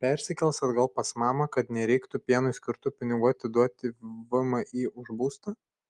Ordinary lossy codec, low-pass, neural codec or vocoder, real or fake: Opus, 32 kbps; 10.8 kHz; none; real